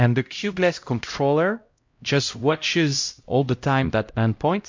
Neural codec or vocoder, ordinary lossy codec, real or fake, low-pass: codec, 16 kHz, 0.5 kbps, X-Codec, HuBERT features, trained on LibriSpeech; MP3, 48 kbps; fake; 7.2 kHz